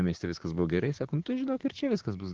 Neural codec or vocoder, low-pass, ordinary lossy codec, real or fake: codec, 16 kHz, 6 kbps, DAC; 7.2 kHz; Opus, 16 kbps; fake